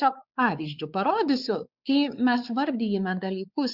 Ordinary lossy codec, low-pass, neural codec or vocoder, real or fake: Opus, 64 kbps; 5.4 kHz; codec, 16 kHz, 4 kbps, X-Codec, WavLM features, trained on Multilingual LibriSpeech; fake